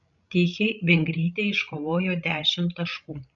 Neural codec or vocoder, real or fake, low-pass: codec, 16 kHz, 16 kbps, FreqCodec, larger model; fake; 7.2 kHz